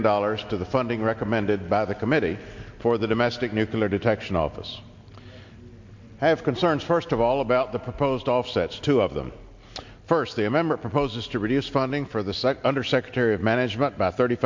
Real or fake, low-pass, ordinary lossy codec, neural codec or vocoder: real; 7.2 kHz; MP3, 48 kbps; none